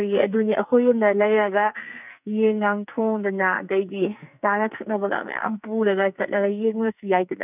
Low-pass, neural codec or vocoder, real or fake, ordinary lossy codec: 3.6 kHz; codec, 44.1 kHz, 2.6 kbps, SNAC; fake; none